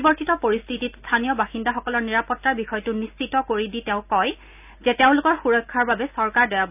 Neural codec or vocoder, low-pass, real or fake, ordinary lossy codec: none; 3.6 kHz; real; none